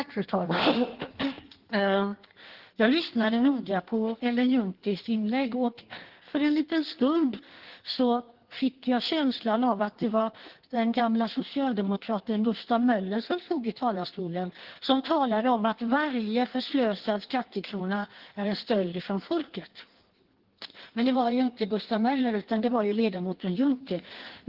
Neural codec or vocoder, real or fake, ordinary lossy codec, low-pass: codec, 16 kHz in and 24 kHz out, 1.1 kbps, FireRedTTS-2 codec; fake; Opus, 16 kbps; 5.4 kHz